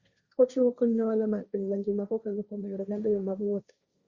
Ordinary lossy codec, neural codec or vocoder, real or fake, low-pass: Opus, 64 kbps; codec, 16 kHz, 1.1 kbps, Voila-Tokenizer; fake; 7.2 kHz